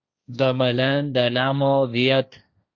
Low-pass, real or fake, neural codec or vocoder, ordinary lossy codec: 7.2 kHz; fake; codec, 16 kHz, 1.1 kbps, Voila-Tokenizer; Opus, 64 kbps